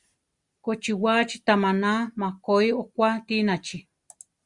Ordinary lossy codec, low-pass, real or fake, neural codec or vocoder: Opus, 64 kbps; 10.8 kHz; real; none